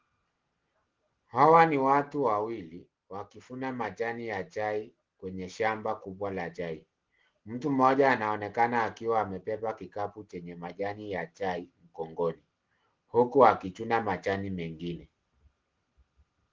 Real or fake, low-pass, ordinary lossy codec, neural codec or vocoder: real; 7.2 kHz; Opus, 16 kbps; none